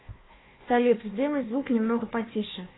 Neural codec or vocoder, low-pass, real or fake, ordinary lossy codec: codec, 16 kHz, 1 kbps, FunCodec, trained on Chinese and English, 50 frames a second; 7.2 kHz; fake; AAC, 16 kbps